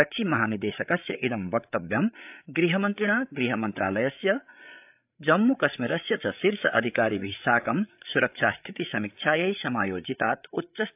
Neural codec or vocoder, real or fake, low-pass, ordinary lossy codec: codec, 16 kHz, 8 kbps, FreqCodec, larger model; fake; 3.6 kHz; AAC, 32 kbps